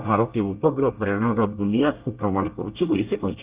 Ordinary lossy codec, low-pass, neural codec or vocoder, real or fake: Opus, 24 kbps; 3.6 kHz; codec, 24 kHz, 1 kbps, SNAC; fake